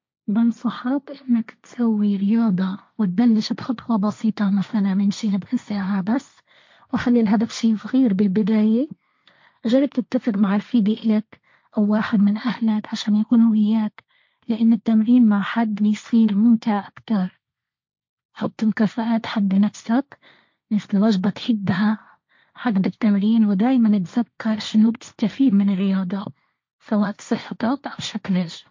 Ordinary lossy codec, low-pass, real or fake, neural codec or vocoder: MP3, 48 kbps; 7.2 kHz; fake; codec, 16 kHz, 1.1 kbps, Voila-Tokenizer